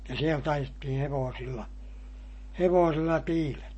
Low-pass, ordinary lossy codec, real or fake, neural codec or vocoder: 9.9 kHz; MP3, 32 kbps; real; none